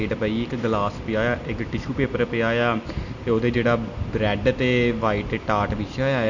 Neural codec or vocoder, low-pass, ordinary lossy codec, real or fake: none; 7.2 kHz; none; real